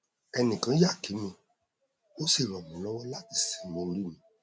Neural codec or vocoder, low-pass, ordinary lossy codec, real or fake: none; none; none; real